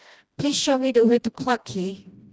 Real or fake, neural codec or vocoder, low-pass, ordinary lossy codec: fake; codec, 16 kHz, 1 kbps, FreqCodec, smaller model; none; none